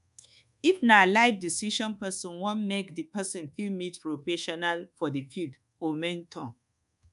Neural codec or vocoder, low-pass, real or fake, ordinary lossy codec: codec, 24 kHz, 1.2 kbps, DualCodec; 10.8 kHz; fake; none